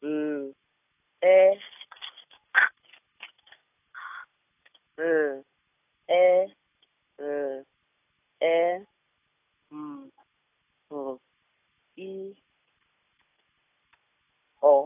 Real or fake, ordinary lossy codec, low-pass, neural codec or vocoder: real; AAC, 24 kbps; 3.6 kHz; none